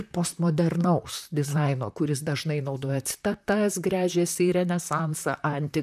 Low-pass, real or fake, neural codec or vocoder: 14.4 kHz; fake; vocoder, 44.1 kHz, 128 mel bands, Pupu-Vocoder